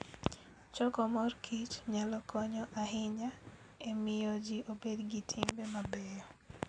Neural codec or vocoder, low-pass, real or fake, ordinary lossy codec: none; 9.9 kHz; real; none